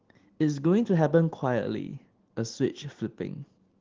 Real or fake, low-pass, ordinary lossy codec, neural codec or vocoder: real; 7.2 kHz; Opus, 16 kbps; none